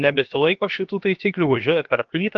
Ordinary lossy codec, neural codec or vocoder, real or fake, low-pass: Opus, 24 kbps; codec, 16 kHz, about 1 kbps, DyCAST, with the encoder's durations; fake; 7.2 kHz